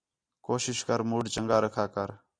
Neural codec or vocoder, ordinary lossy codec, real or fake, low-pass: none; AAC, 48 kbps; real; 9.9 kHz